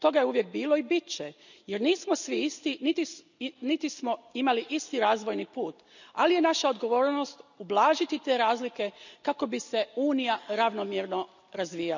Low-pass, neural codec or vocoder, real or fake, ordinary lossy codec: 7.2 kHz; none; real; none